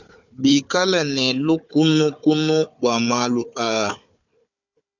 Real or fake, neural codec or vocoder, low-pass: fake; codec, 16 kHz, 4 kbps, FunCodec, trained on Chinese and English, 50 frames a second; 7.2 kHz